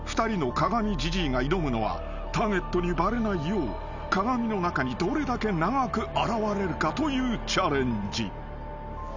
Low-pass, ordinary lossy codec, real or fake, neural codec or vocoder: 7.2 kHz; none; real; none